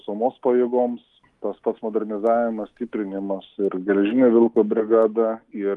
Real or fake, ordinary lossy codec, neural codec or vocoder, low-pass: real; Opus, 32 kbps; none; 10.8 kHz